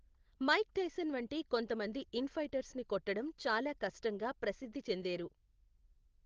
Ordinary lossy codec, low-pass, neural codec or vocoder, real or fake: Opus, 16 kbps; 7.2 kHz; none; real